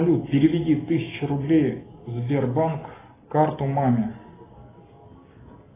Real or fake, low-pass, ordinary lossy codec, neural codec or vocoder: real; 3.6 kHz; AAC, 16 kbps; none